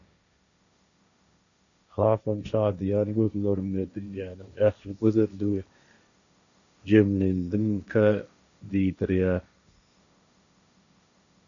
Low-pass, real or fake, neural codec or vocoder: 7.2 kHz; fake; codec, 16 kHz, 1.1 kbps, Voila-Tokenizer